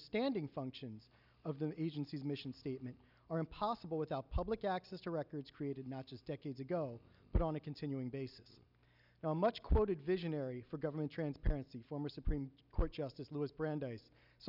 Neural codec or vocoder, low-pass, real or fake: none; 5.4 kHz; real